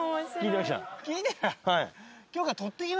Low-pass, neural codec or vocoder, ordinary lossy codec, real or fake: none; none; none; real